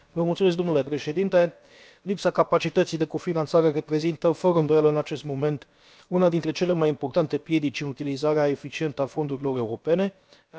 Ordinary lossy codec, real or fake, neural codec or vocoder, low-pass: none; fake; codec, 16 kHz, about 1 kbps, DyCAST, with the encoder's durations; none